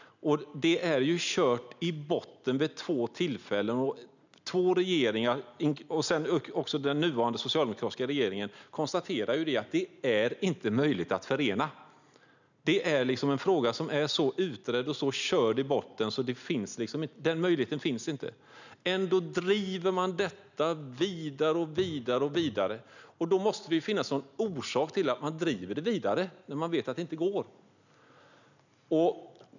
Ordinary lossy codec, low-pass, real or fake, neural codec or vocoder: none; 7.2 kHz; real; none